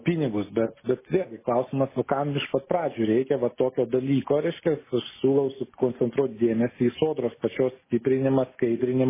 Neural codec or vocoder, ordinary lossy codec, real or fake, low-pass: none; MP3, 16 kbps; real; 3.6 kHz